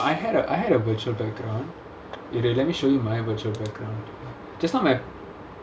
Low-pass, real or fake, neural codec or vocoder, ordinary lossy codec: none; real; none; none